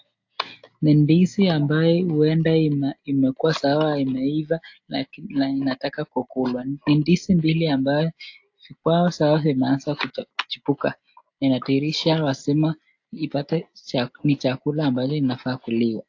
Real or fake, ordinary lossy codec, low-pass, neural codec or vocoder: real; AAC, 48 kbps; 7.2 kHz; none